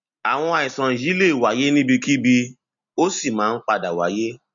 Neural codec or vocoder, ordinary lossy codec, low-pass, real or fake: none; MP3, 64 kbps; 7.2 kHz; real